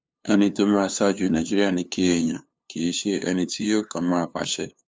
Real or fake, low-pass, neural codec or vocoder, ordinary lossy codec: fake; none; codec, 16 kHz, 2 kbps, FunCodec, trained on LibriTTS, 25 frames a second; none